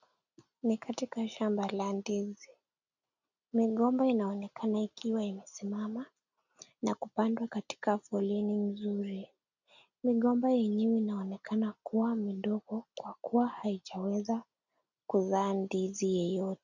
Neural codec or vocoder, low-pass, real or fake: none; 7.2 kHz; real